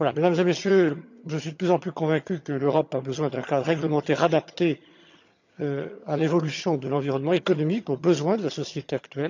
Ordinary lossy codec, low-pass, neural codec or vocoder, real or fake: none; 7.2 kHz; vocoder, 22.05 kHz, 80 mel bands, HiFi-GAN; fake